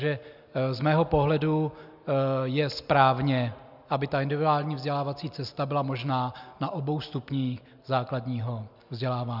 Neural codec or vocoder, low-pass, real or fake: none; 5.4 kHz; real